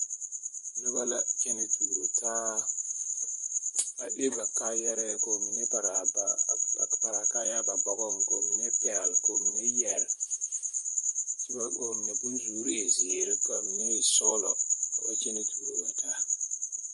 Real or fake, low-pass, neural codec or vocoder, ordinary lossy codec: fake; 14.4 kHz; vocoder, 44.1 kHz, 128 mel bands, Pupu-Vocoder; MP3, 48 kbps